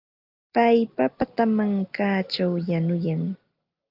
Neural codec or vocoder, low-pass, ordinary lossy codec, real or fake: none; 5.4 kHz; Opus, 32 kbps; real